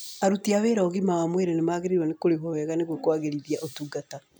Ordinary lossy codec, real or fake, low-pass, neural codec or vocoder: none; real; none; none